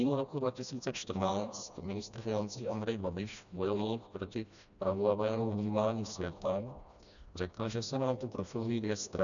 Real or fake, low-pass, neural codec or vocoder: fake; 7.2 kHz; codec, 16 kHz, 1 kbps, FreqCodec, smaller model